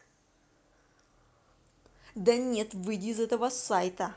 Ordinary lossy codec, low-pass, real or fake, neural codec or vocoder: none; none; real; none